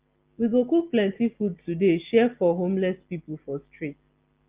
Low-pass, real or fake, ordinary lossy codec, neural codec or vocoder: 3.6 kHz; real; Opus, 32 kbps; none